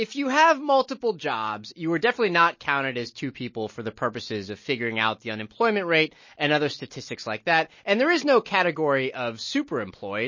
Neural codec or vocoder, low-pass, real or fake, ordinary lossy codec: none; 7.2 kHz; real; MP3, 32 kbps